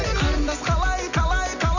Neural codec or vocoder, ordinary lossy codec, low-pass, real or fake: none; none; 7.2 kHz; real